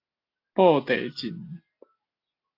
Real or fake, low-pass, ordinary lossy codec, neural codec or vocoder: real; 5.4 kHz; AAC, 32 kbps; none